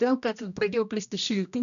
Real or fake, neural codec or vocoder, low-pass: fake; codec, 16 kHz, 1 kbps, X-Codec, HuBERT features, trained on general audio; 7.2 kHz